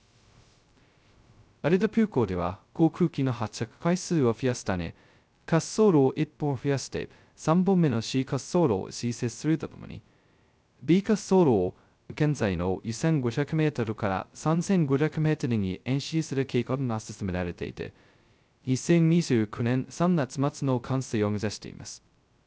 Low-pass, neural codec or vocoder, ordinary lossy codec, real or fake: none; codec, 16 kHz, 0.2 kbps, FocalCodec; none; fake